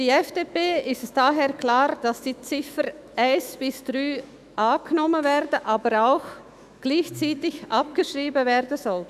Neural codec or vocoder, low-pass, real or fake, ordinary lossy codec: autoencoder, 48 kHz, 128 numbers a frame, DAC-VAE, trained on Japanese speech; 14.4 kHz; fake; none